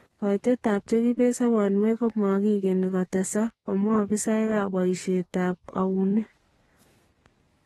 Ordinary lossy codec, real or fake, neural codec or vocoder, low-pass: AAC, 32 kbps; fake; codec, 32 kHz, 1.9 kbps, SNAC; 14.4 kHz